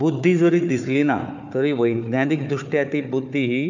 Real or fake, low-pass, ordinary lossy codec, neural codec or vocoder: fake; 7.2 kHz; none; codec, 16 kHz, 4 kbps, FunCodec, trained on Chinese and English, 50 frames a second